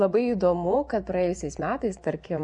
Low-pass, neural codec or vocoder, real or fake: 10.8 kHz; vocoder, 24 kHz, 100 mel bands, Vocos; fake